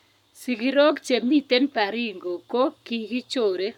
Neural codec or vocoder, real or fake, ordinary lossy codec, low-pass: codec, 44.1 kHz, 7.8 kbps, Pupu-Codec; fake; none; 19.8 kHz